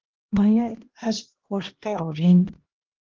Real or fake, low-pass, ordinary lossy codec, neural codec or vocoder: fake; 7.2 kHz; Opus, 16 kbps; codec, 16 kHz, 1 kbps, X-Codec, HuBERT features, trained on LibriSpeech